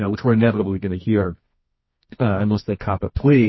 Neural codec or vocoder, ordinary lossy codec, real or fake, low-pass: codec, 24 kHz, 0.9 kbps, WavTokenizer, medium music audio release; MP3, 24 kbps; fake; 7.2 kHz